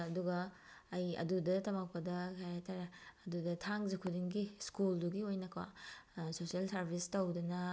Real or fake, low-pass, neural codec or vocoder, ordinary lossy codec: real; none; none; none